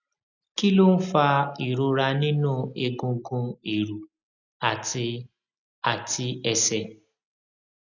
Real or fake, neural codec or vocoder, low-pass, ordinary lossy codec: real; none; 7.2 kHz; none